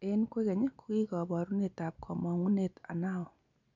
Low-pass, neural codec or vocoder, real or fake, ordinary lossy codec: 7.2 kHz; none; real; none